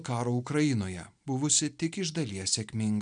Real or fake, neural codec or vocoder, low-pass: real; none; 9.9 kHz